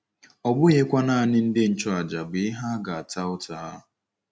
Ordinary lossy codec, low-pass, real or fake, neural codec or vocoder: none; none; real; none